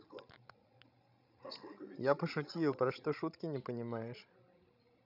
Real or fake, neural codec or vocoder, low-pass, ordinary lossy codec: fake; codec, 16 kHz, 16 kbps, FreqCodec, larger model; 5.4 kHz; none